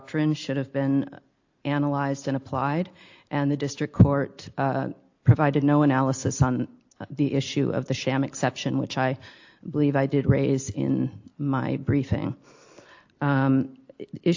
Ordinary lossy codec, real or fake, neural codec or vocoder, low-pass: AAC, 48 kbps; real; none; 7.2 kHz